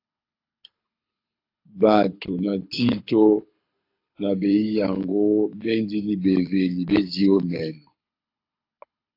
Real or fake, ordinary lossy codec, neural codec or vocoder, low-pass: fake; AAC, 32 kbps; codec, 24 kHz, 6 kbps, HILCodec; 5.4 kHz